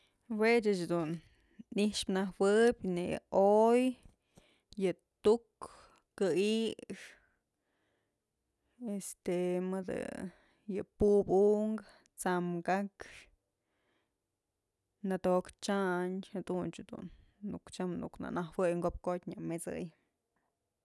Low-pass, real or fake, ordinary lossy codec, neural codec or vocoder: none; real; none; none